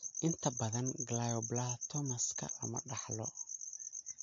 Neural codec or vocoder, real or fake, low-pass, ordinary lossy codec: none; real; 7.2 kHz; MP3, 48 kbps